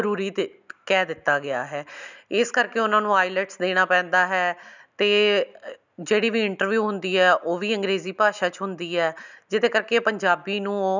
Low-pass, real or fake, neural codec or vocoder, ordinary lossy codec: 7.2 kHz; real; none; none